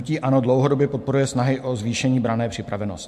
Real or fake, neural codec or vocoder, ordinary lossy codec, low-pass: real; none; MP3, 64 kbps; 14.4 kHz